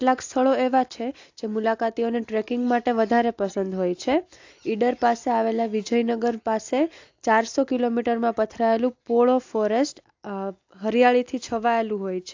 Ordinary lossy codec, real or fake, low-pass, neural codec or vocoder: AAC, 48 kbps; real; 7.2 kHz; none